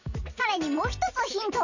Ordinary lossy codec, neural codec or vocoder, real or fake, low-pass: none; none; real; 7.2 kHz